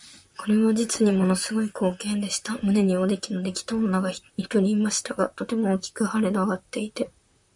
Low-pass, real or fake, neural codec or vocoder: 10.8 kHz; fake; vocoder, 44.1 kHz, 128 mel bands, Pupu-Vocoder